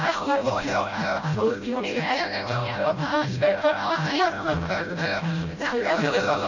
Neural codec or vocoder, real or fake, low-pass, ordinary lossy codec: codec, 16 kHz, 0.5 kbps, FreqCodec, smaller model; fake; 7.2 kHz; none